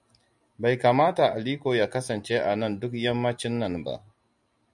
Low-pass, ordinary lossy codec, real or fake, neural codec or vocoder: 10.8 kHz; MP3, 96 kbps; real; none